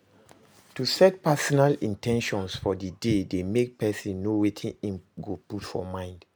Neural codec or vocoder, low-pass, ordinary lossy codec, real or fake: none; none; none; real